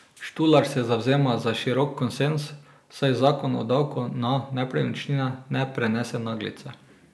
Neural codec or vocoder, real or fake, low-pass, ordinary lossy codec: none; real; none; none